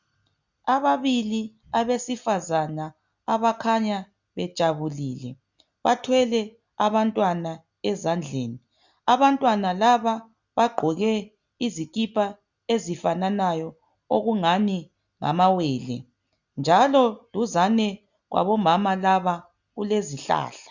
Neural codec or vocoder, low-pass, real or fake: none; 7.2 kHz; real